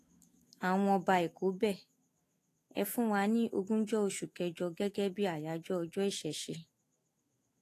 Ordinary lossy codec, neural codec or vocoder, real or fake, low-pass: AAC, 48 kbps; autoencoder, 48 kHz, 128 numbers a frame, DAC-VAE, trained on Japanese speech; fake; 14.4 kHz